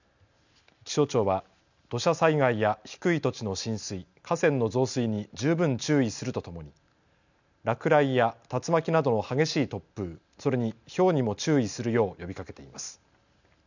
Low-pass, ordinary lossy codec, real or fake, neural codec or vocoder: 7.2 kHz; none; real; none